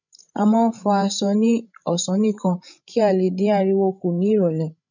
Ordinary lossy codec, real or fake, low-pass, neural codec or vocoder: none; fake; 7.2 kHz; codec, 16 kHz, 16 kbps, FreqCodec, larger model